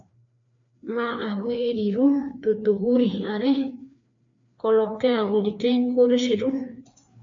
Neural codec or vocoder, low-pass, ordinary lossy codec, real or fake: codec, 16 kHz, 2 kbps, FreqCodec, larger model; 7.2 kHz; MP3, 48 kbps; fake